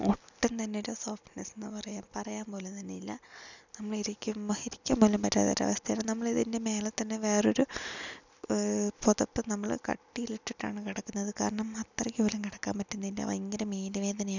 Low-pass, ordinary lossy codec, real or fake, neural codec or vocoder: 7.2 kHz; none; real; none